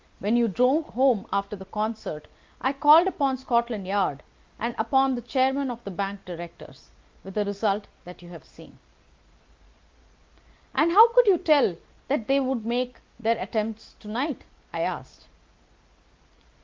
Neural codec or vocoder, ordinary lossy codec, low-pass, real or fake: none; Opus, 32 kbps; 7.2 kHz; real